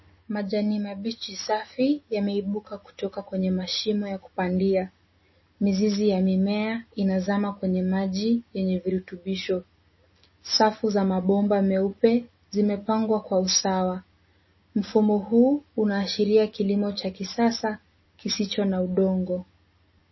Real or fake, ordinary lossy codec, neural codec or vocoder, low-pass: real; MP3, 24 kbps; none; 7.2 kHz